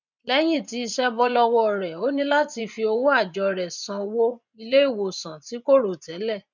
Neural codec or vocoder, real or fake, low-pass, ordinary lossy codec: vocoder, 24 kHz, 100 mel bands, Vocos; fake; 7.2 kHz; none